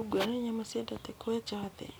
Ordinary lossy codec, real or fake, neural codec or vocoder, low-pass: none; real; none; none